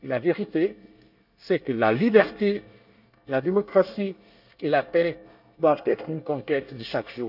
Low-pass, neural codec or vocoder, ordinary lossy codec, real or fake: 5.4 kHz; codec, 24 kHz, 1 kbps, SNAC; none; fake